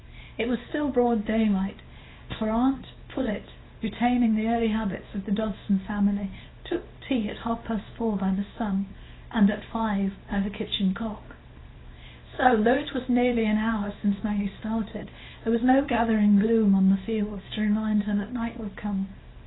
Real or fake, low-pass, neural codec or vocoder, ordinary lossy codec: fake; 7.2 kHz; codec, 24 kHz, 0.9 kbps, WavTokenizer, small release; AAC, 16 kbps